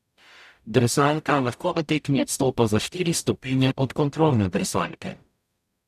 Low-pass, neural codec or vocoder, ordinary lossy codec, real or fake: 14.4 kHz; codec, 44.1 kHz, 0.9 kbps, DAC; none; fake